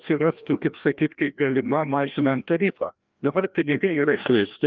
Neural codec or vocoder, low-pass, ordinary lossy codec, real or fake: codec, 16 kHz, 1 kbps, FreqCodec, larger model; 7.2 kHz; Opus, 32 kbps; fake